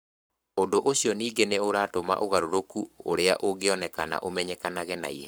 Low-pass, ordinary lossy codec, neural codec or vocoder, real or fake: none; none; codec, 44.1 kHz, 7.8 kbps, Pupu-Codec; fake